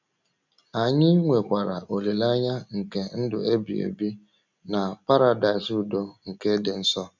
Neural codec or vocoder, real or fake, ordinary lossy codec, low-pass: none; real; none; 7.2 kHz